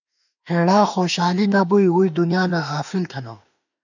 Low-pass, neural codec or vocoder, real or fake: 7.2 kHz; autoencoder, 48 kHz, 32 numbers a frame, DAC-VAE, trained on Japanese speech; fake